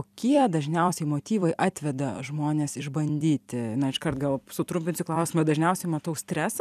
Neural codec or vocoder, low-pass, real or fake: vocoder, 44.1 kHz, 128 mel bands every 256 samples, BigVGAN v2; 14.4 kHz; fake